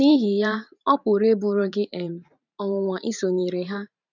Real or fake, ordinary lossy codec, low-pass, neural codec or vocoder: fake; none; 7.2 kHz; vocoder, 44.1 kHz, 128 mel bands every 512 samples, BigVGAN v2